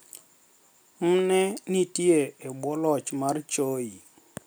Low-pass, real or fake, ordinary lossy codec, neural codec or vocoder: none; real; none; none